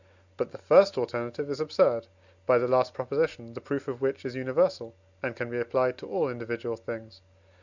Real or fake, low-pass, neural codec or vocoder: real; 7.2 kHz; none